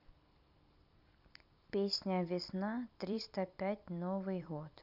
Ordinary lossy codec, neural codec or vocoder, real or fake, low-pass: none; vocoder, 44.1 kHz, 128 mel bands every 256 samples, BigVGAN v2; fake; 5.4 kHz